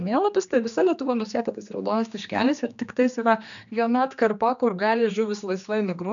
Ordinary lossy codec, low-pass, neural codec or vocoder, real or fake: MP3, 96 kbps; 7.2 kHz; codec, 16 kHz, 2 kbps, X-Codec, HuBERT features, trained on general audio; fake